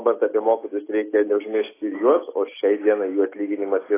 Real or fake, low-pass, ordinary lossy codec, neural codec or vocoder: real; 3.6 kHz; AAC, 16 kbps; none